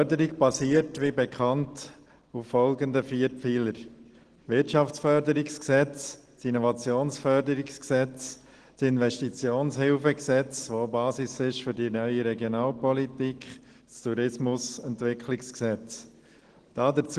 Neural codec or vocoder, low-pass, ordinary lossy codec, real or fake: none; 9.9 kHz; Opus, 16 kbps; real